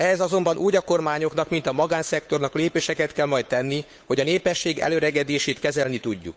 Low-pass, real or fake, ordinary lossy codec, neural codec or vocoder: none; fake; none; codec, 16 kHz, 8 kbps, FunCodec, trained on Chinese and English, 25 frames a second